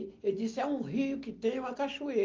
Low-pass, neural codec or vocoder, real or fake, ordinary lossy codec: 7.2 kHz; none; real; Opus, 24 kbps